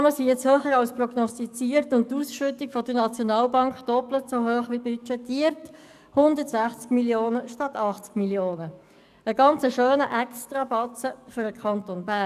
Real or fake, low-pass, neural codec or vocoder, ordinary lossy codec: fake; 14.4 kHz; codec, 44.1 kHz, 7.8 kbps, DAC; none